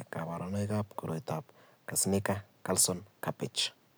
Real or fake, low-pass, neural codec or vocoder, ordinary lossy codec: real; none; none; none